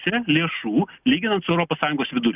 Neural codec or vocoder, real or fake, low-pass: none; real; 3.6 kHz